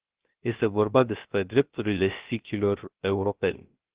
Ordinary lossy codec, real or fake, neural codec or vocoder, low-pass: Opus, 16 kbps; fake; codec, 16 kHz, 0.3 kbps, FocalCodec; 3.6 kHz